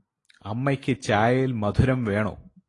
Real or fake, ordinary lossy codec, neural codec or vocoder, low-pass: real; AAC, 32 kbps; none; 10.8 kHz